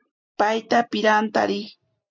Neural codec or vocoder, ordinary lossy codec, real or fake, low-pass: none; MP3, 64 kbps; real; 7.2 kHz